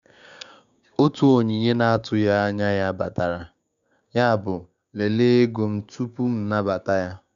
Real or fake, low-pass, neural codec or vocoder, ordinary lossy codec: fake; 7.2 kHz; codec, 16 kHz, 6 kbps, DAC; none